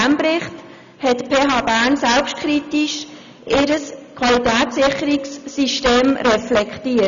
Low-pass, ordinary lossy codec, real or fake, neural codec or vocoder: 7.2 kHz; none; real; none